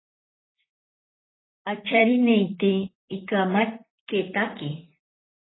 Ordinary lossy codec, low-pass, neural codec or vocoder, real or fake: AAC, 16 kbps; 7.2 kHz; vocoder, 44.1 kHz, 128 mel bands, Pupu-Vocoder; fake